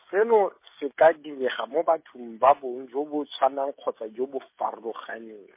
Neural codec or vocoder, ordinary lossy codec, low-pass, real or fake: codec, 16 kHz, 16 kbps, FreqCodec, smaller model; MP3, 24 kbps; 3.6 kHz; fake